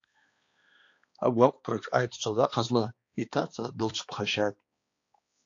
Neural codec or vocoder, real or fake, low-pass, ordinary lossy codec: codec, 16 kHz, 2 kbps, X-Codec, HuBERT features, trained on balanced general audio; fake; 7.2 kHz; AAC, 48 kbps